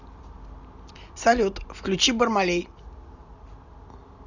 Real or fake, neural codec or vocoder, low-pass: real; none; 7.2 kHz